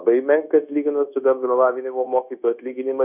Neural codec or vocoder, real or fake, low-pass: codec, 16 kHz, 0.9 kbps, LongCat-Audio-Codec; fake; 3.6 kHz